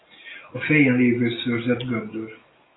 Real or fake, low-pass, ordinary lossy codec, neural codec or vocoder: real; 7.2 kHz; AAC, 16 kbps; none